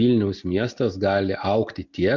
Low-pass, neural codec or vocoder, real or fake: 7.2 kHz; none; real